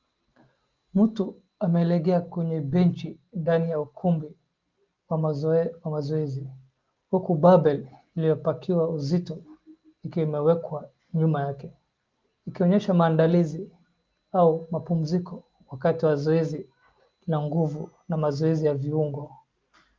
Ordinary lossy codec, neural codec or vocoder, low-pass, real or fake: Opus, 32 kbps; none; 7.2 kHz; real